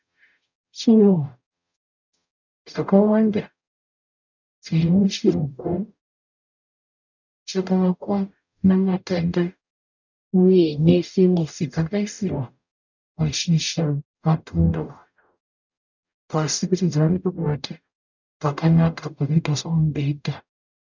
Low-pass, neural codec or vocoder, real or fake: 7.2 kHz; codec, 44.1 kHz, 0.9 kbps, DAC; fake